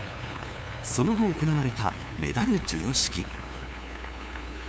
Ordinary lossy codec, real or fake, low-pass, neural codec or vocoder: none; fake; none; codec, 16 kHz, 2 kbps, FunCodec, trained on LibriTTS, 25 frames a second